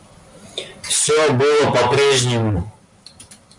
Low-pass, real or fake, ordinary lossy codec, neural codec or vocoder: 10.8 kHz; real; MP3, 64 kbps; none